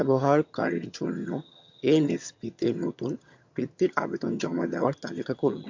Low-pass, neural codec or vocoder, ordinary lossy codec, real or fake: 7.2 kHz; vocoder, 22.05 kHz, 80 mel bands, HiFi-GAN; MP3, 48 kbps; fake